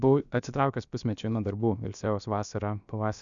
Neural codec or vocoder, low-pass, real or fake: codec, 16 kHz, about 1 kbps, DyCAST, with the encoder's durations; 7.2 kHz; fake